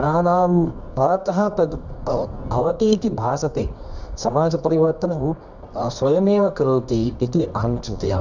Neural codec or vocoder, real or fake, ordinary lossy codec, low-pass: codec, 24 kHz, 0.9 kbps, WavTokenizer, medium music audio release; fake; none; 7.2 kHz